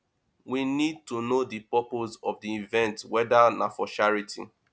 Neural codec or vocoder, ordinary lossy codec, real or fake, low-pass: none; none; real; none